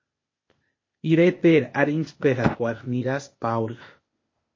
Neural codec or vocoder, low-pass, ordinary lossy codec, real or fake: codec, 16 kHz, 0.8 kbps, ZipCodec; 7.2 kHz; MP3, 32 kbps; fake